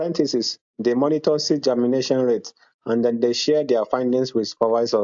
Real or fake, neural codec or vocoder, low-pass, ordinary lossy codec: real; none; 7.2 kHz; AAC, 64 kbps